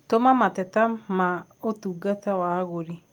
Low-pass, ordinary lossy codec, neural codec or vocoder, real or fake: 19.8 kHz; Opus, 32 kbps; none; real